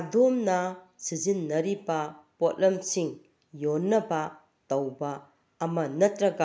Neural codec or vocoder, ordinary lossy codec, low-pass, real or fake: none; none; none; real